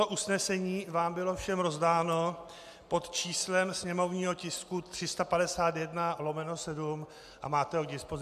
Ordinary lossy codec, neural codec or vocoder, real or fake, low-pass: MP3, 96 kbps; none; real; 14.4 kHz